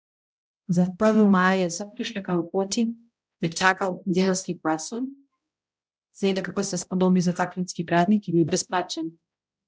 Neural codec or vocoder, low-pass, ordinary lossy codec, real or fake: codec, 16 kHz, 0.5 kbps, X-Codec, HuBERT features, trained on balanced general audio; none; none; fake